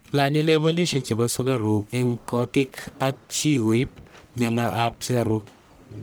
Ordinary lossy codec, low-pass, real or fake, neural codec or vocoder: none; none; fake; codec, 44.1 kHz, 1.7 kbps, Pupu-Codec